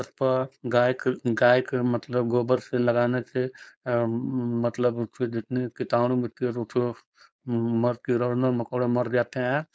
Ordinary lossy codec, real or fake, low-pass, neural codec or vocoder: none; fake; none; codec, 16 kHz, 4.8 kbps, FACodec